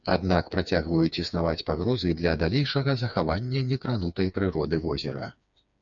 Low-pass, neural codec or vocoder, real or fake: 7.2 kHz; codec, 16 kHz, 4 kbps, FreqCodec, smaller model; fake